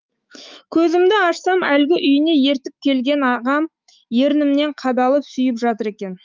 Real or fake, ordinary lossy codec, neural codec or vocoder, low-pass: fake; Opus, 24 kbps; autoencoder, 48 kHz, 128 numbers a frame, DAC-VAE, trained on Japanese speech; 7.2 kHz